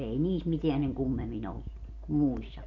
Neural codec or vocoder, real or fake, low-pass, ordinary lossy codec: none; real; 7.2 kHz; AAC, 48 kbps